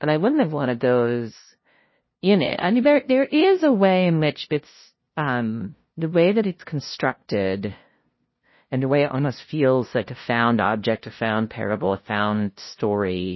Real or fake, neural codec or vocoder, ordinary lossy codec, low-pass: fake; codec, 16 kHz, 0.5 kbps, FunCodec, trained on LibriTTS, 25 frames a second; MP3, 24 kbps; 7.2 kHz